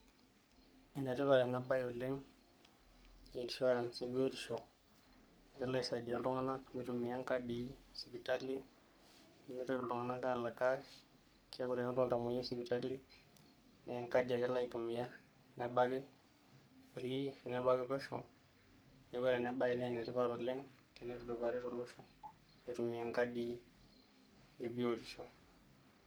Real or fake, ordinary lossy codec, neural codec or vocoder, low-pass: fake; none; codec, 44.1 kHz, 3.4 kbps, Pupu-Codec; none